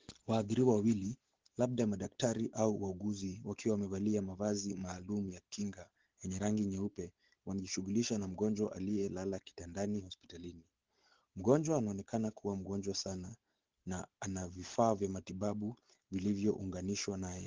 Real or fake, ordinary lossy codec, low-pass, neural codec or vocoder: real; Opus, 16 kbps; 7.2 kHz; none